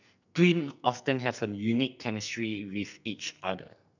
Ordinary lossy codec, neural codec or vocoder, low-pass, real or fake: none; codec, 44.1 kHz, 2.6 kbps, SNAC; 7.2 kHz; fake